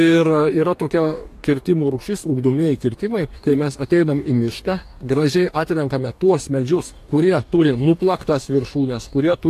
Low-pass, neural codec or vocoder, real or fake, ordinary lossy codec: 14.4 kHz; codec, 44.1 kHz, 2.6 kbps, DAC; fake; AAC, 64 kbps